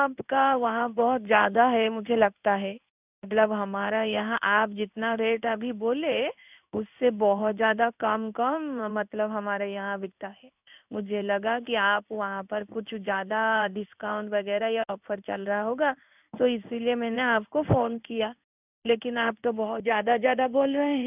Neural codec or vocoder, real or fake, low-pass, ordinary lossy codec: codec, 16 kHz in and 24 kHz out, 1 kbps, XY-Tokenizer; fake; 3.6 kHz; none